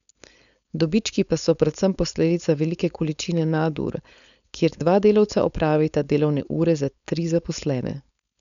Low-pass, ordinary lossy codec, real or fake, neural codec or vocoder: 7.2 kHz; none; fake; codec, 16 kHz, 4.8 kbps, FACodec